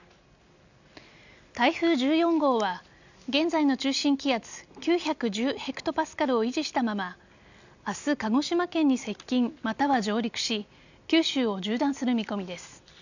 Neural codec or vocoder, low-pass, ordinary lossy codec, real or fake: none; 7.2 kHz; none; real